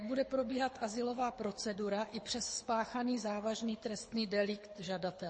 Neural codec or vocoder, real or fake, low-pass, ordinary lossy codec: codec, 24 kHz, 6 kbps, HILCodec; fake; 9.9 kHz; MP3, 32 kbps